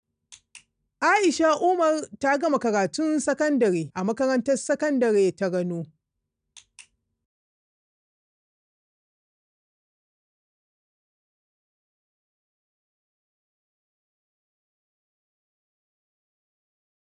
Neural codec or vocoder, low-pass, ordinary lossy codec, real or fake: none; 9.9 kHz; none; real